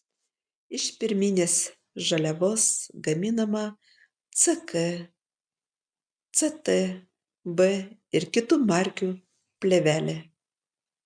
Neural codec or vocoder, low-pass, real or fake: none; 9.9 kHz; real